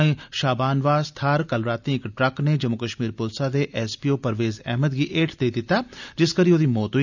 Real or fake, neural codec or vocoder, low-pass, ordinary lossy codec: real; none; 7.2 kHz; none